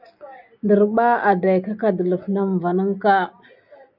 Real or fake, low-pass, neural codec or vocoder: real; 5.4 kHz; none